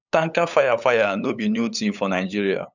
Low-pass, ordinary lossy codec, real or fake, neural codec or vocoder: 7.2 kHz; none; fake; codec, 16 kHz, 8 kbps, FunCodec, trained on LibriTTS, 25 frames a second